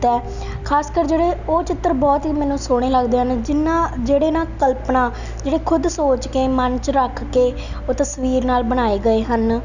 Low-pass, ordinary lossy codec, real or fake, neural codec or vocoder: 7.2 kHz; none; real; none